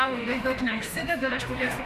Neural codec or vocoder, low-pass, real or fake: autoencoder, 48 kHz, 32 numbers a frame, DAC-VAE, trained on Japanese speech; 14.4 kHz; fake